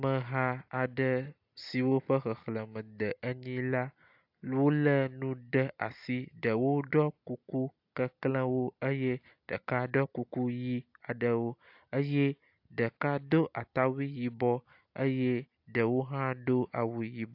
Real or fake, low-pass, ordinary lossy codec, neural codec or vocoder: real; 5.4 kHz; AAC, 48 kbps; none